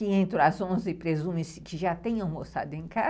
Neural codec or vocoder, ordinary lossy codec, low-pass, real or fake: none; none; none; real